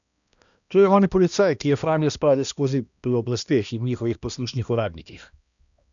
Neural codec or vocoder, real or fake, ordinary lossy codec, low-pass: codec, 16 kHz, 1 kbps, X-Codec, HuBERT features, trained on balanced general audio; fake; none; 7.2 kHz